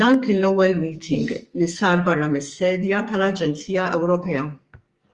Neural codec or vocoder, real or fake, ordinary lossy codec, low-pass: codec, 44.1 kHz, 2.6 kbps, SNAC; fake; Opus, 32 kbps; 10.8 kHz